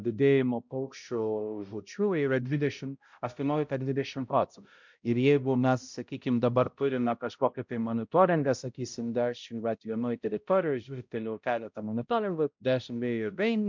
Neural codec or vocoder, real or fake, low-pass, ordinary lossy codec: codec, 16 kHz, 0.5 kbps, X-Codec, HuBERT features, trained on balanced general audio; fake; 7.2 kHz; MP3, 64 kbps